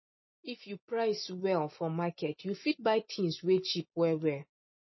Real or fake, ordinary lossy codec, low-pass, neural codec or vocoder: real; MP3, 24 kbps; 7.2 kHz; none